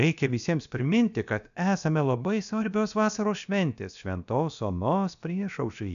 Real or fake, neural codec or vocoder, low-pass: fake; codec, 16 kHz, about 1 kbps, DyCAST, with the encoder's durations; 7.2 kHz